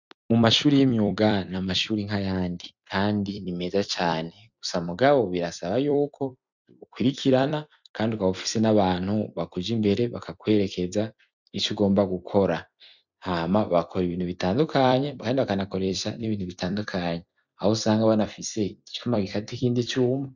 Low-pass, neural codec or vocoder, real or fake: 7.2 kHz; vocoder, 44.1 kHz, 128 mel bands every 256 samples, BigVGAN v2; fake